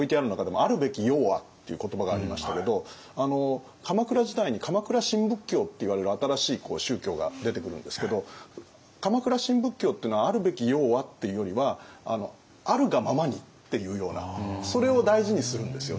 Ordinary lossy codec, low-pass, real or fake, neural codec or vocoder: none; none; real; none